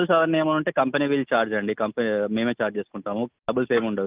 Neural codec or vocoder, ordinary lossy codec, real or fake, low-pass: none; Opus, 24 kbps; real; 3.6 kHz